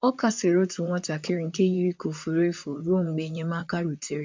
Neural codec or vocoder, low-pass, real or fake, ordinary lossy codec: vocoder, 44.1 kHz, 128 mel bands, Pupu-Vocoder; 7.2 kHz; fake; MP3, 64 kbps